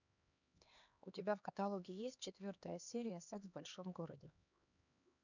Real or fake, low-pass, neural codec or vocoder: fake; 7.2 kHz; codec, 16 kHz, 2 kbps, X-Codec, HuBERT features, trained on LibriSpeech